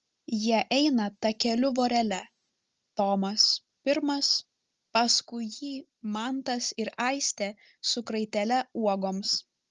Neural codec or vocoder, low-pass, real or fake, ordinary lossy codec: none; 7.2 kHz; real; Opus, 24 kbps